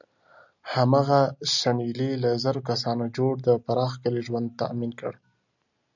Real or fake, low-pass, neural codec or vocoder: real; 7.2 kHz; none